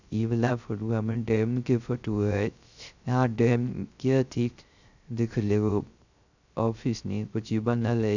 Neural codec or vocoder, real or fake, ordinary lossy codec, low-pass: codec, 16 kHz, 0.3 kbps, FocalCodec; fake; none; 7.2 kHz